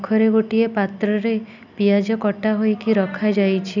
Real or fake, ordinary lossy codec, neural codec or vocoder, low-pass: real; none; none; 7.2 kHz